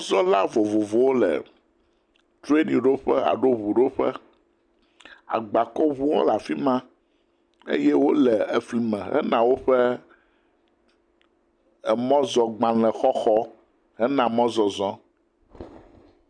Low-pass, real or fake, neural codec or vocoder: 9.9 kHz; real; none